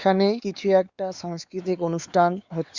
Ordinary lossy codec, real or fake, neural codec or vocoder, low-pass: Opus, 64 kbps; fake; codec, 16 kHz, 4 kbps, X-Codec, WavLM features, trained on Multilingual LibriSpeech; 7.2 kHz